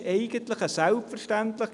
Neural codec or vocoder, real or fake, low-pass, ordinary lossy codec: none; real; 10.8 kHz; none